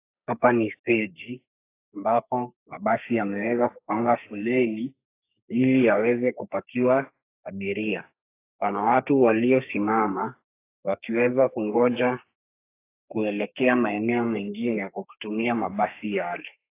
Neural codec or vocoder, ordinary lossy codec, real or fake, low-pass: codec, 32 kHz, 1.9 kbps, SNAC; AAC, 24 kbps; fake; 3.6 kHz